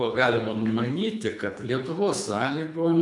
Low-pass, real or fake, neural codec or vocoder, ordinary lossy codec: 10.8 kHz; fake; codec, 24 kHz, 3 kbps, HILCodec; MP3, 96 kbps